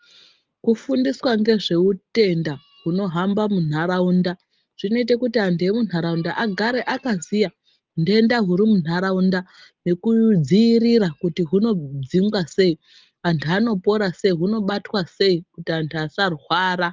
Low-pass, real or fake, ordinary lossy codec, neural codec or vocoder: 7.2 kHz; real; Opus, 24 kbps; none